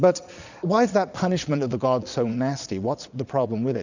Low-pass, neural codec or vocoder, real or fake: 7.2 kHz; vocoder, 22.05 kHz, 80 mel bands, Vocos; fake